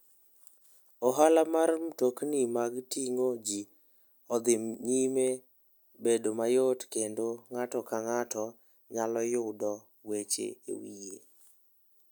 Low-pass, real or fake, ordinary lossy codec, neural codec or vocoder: none; real; none; none